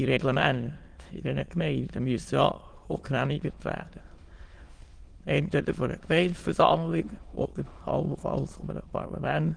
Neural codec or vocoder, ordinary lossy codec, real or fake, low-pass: autoencoder, 22.05 kHz, a latent of 192 numbers a frame, VITS, trained on many speakers; Opus, 24 kbps; fake; 9.9 kHz